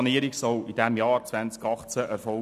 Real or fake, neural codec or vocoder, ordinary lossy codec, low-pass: real; none; none; 14.4 kHz